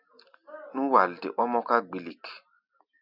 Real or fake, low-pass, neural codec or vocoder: real; 5.4 kHz; none